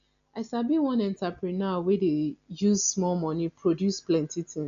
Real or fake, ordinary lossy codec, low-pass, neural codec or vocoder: real; MP3, 96 kbps; 7.2 kHz; none